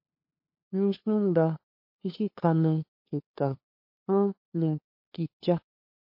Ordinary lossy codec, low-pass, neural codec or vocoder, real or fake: MP3, 32 kbps; 5.4 kHz; codec, 16 kHz, 2 kbps, FunCodec, trained on LibriTTS, 25 frames a second; fake